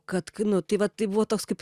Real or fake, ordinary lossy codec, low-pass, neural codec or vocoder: real; Opus, 64 kbps; 14.4 kHz; none